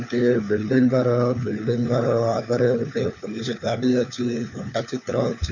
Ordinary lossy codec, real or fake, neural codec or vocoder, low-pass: none; fake; codec, 16 kHz, 4 kbps, FunCodec, trained on LibriTTS, 50 frames a second; 7.2 kHz